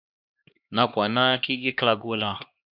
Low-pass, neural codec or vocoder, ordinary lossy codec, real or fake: 5.4 kHz; codec, 16 kHz, 2 kbps, X-Codec, HuBERT features, trained on LibriSpeech; AAC, 48 kbps; fake